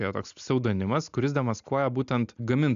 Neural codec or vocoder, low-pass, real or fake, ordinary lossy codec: none; 7.2 kHz; real; AAC, 96 kbps